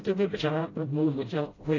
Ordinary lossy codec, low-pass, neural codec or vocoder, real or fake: AAC, 32 kbps; 7.2 kHz; codec, 16 kHz, 0.5 kbps, FreqCodec, smaller model; fake